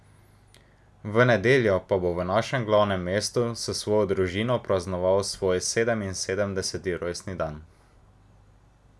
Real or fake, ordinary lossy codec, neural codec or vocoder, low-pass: real; none; none; none